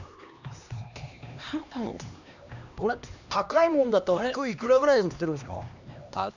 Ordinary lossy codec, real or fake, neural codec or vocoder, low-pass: none; fake; codec, 16 kHz, 2 kbps, X-Codec, HuBERT features, trained on LibriSpeech; 7.2 kHz